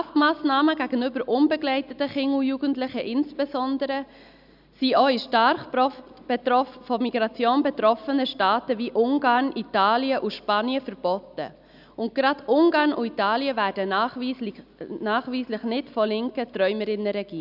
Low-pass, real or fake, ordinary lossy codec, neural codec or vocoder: 5.4 kHz; real; none; none